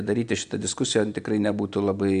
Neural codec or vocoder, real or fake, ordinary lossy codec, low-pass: none; real; MP3, 64 kbps; 9.9 kHz